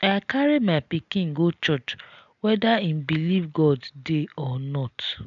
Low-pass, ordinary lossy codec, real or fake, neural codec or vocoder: 7.2 kHz; MP3, 96 kbps; real; none